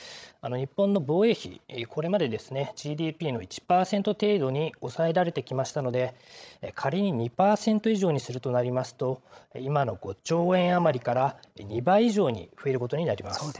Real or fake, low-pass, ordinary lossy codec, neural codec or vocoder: fake; none; none; codec, 16 kHz, 16 kbps, FreqCodec, larger model